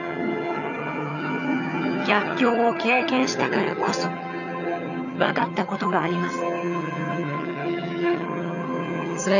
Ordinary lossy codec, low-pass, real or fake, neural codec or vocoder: none; 7.2 kHz; fake; vocoder, 22.05 kHz, 80 mel bands, HiFi-GAN